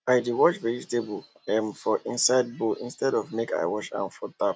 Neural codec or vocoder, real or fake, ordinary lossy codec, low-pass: none; real; none; none